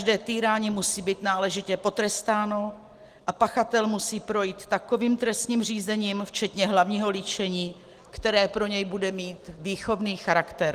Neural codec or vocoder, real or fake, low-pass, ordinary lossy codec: none; real; 14.4 kHz; Opus, 24 kbps